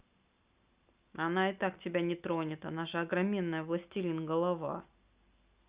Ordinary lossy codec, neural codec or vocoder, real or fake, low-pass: Opus, 64 kbps; none; real; 3.6 kHz